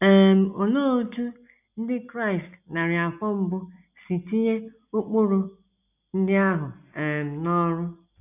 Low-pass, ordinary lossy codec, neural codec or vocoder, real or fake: 3.6 kHz; none; none; real